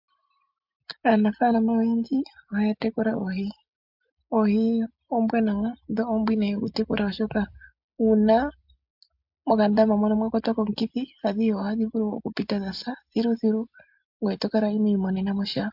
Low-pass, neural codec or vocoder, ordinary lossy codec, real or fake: 5.4 kHz; none; MP3, 48 kbps; real